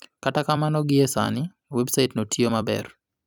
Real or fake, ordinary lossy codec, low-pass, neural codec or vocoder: fake; none; 19.8 kHz; vocoder, 44.1 kHz, 128 mel bands every 256 samples, BigVGAN v2